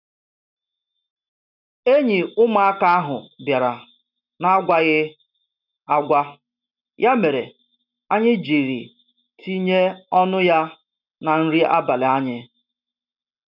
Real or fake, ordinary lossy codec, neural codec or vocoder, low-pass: real; none; none; 5.4 kHz